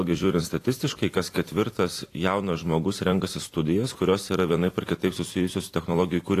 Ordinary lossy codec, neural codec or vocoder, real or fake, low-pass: AAC, 48 kbps; vocoder, 44.1 kHz, 128 mel bands every 256 samples, BigVGAN v2; fake; 14.4 kHz